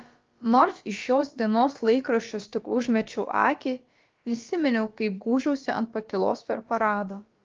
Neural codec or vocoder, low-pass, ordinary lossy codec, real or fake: codec, 16 kHz, about 1 kbps, DyCAST, with the encoder's durations; 7.2 kHz; Opus, 24 kbps; fake